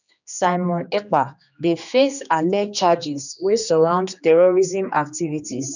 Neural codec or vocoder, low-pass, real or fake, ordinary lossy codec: codec, 16 kHz, 2 kbps, X-Codec, HuBERT features, trained on general audio; 7.2 kHz; fake; none